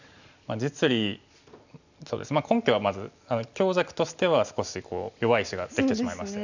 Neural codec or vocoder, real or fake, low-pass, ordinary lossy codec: none; real; 7.2 kHz; none